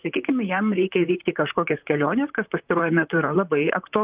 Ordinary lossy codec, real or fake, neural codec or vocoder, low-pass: Opus, 24 kbps; fake; vocoder, 44.1 kHz, 128 mel bands, Pupu-Vocoder; 3.6 kHz